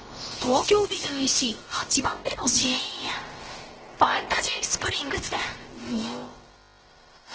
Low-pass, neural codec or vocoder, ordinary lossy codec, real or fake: 7.2 kHz; codec, 16 kHz, about 1 kbps, DyCAST, with the encoder's durations; Opus, 16 kbps; fake